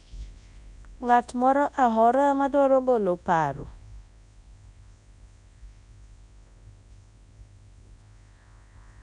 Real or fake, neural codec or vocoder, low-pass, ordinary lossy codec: fake; codec, 24 kHz, 0.9 kbps, WavTokenizer, large speech release; 10.8 kHz; none